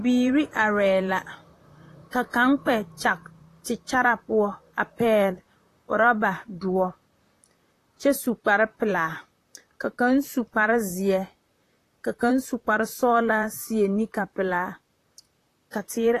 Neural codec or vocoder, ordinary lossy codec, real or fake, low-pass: vocoder, 44.1 kHz, 128 mel bands, Pupu-Vocoder; AAC, 48 kbps; fake; 14.4 kHz